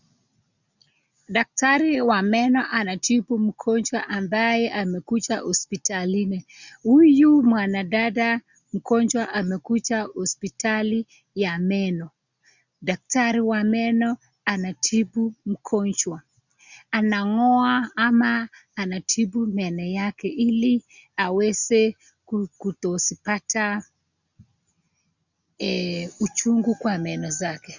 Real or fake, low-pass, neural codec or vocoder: real; 7.2 kHz; none